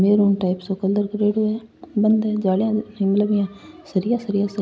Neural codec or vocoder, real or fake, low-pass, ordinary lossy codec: none; real; none; none